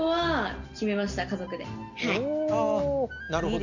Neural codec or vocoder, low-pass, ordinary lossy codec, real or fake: none; 7.2 kHz; none; real